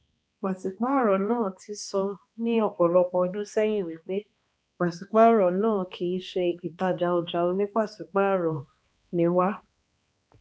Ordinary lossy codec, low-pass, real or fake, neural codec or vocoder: none; none; fake; codec, 16 kHz, 2 kbps, X-Codec, HuBERT features, trained on balanced general audio